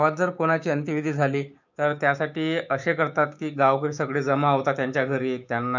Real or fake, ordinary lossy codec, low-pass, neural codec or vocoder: fake; none; 7.2 kHz; codec, 16 kHz, 6 kbps, DAC